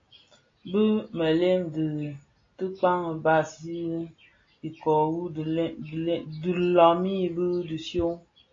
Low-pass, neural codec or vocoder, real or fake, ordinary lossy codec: 7.2 kHz; none; real; AAC, 32 kbps